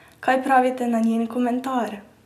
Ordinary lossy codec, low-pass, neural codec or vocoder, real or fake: none; 14.4 kHz; none; real